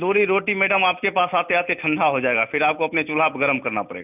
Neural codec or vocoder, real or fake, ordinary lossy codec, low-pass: none; real; none; 3.6 kHz